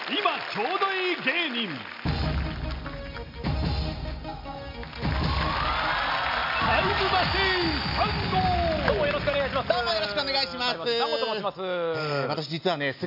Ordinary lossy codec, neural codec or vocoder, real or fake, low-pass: MP3, 48 kbps; none; real; 5.4 kHz